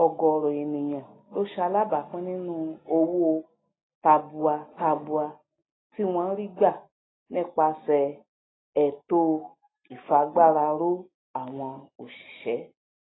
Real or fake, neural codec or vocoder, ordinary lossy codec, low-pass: real; none; AAC, 16 kbps; 7.2 kHz